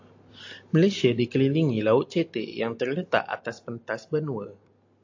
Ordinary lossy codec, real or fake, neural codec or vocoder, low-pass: AAC, 48 kbps; real; none; 7.2 kHz